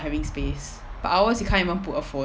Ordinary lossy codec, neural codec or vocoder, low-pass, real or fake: none; none; none; real